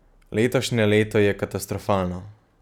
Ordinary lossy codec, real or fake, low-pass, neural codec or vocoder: none; real; 19.8 kHz; none